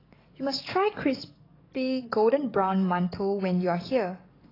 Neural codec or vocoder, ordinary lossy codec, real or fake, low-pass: codec, 44.1 kHz, 7.8 kbps, DAC; AAC, 24 kbps; fake; 5.4 kHz